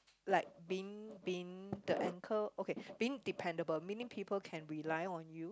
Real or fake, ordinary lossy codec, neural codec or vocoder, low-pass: real; none; none; none